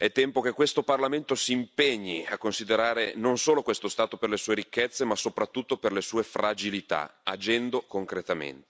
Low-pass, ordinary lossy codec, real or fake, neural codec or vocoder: none; none; real; none